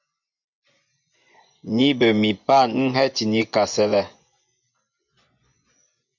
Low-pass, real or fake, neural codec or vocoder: 7.2 kHz; fake; vocoder, 24 kHz, 100 mel bands, Vocos